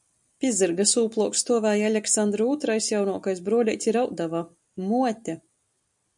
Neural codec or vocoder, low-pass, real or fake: none; 10.8 kHz; real